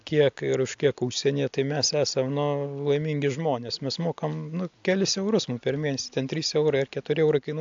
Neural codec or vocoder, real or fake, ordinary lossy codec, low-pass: none; real; AAC, 64 kbps; 7.2 kHz